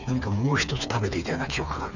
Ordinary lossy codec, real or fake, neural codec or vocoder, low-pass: none; fake; codec, 16 kHz, 4 kbps, FreqCodec, smaller model; 7.2 kHz